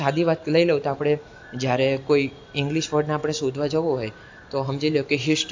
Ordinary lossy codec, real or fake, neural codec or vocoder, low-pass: AAC, 48 kbps; real; none; 7.2 kHz